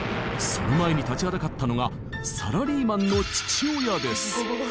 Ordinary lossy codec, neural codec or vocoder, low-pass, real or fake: none; none; none; real